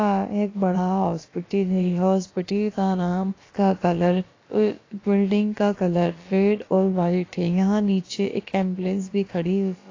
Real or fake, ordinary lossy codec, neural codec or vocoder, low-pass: fake; AAC, 32 kbps; codec, 16 kHz, about 1 kbps, DyCAST, with the encoder's durations; 7.2 kHz